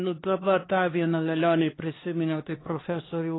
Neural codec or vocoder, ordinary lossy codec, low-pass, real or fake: codec, 16 kHz, 2 kbps, X-Codec, HuBERT features, trained on LibriSpeech; AAC, 16 kbps; 7.2 kHz; fake